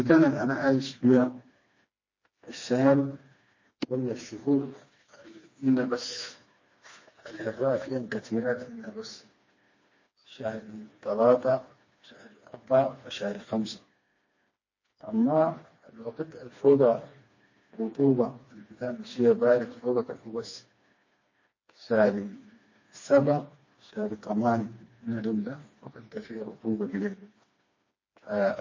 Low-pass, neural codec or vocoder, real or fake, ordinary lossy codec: 7.2 kHz; codec, 16 kHz, 2 kbps, FreqCodec, smaller model; fake; MP3, 32 kbps